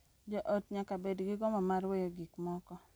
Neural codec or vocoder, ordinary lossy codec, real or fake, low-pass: none; none; real; none